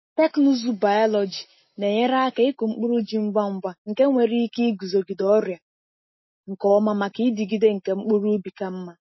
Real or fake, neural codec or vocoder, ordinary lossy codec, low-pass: real; none; MP3, 24 kbps; 7.2 kHz